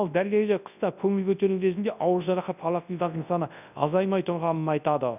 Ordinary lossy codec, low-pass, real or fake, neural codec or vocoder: none; 3.6 kHz; fake; codec, 24 kHz, 0.9 kbps, WavTokenizer, large speech release